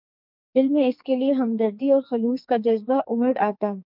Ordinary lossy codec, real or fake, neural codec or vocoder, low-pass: MP3, 48 kbps; fake; codec, 44.1 kHz, 2.6 kbps, SNAC; 5.4 kHz